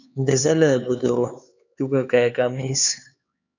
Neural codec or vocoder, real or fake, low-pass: codec, 16 kHz, 4 kbps, X-Codec, HuBERT features, trained on LibriSpeech; fake; 7.2 kHz